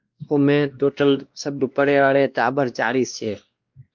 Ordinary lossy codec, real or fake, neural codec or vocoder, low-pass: Opus, 24 kbps; fake; codec, 16 kHz, 1 kbps, X-Codec, WavLM features, trained on Multilingual LibriSpeech; 7.2 kHz